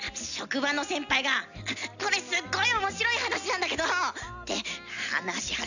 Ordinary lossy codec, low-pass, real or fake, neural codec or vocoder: none; 7.2 kHz; real; none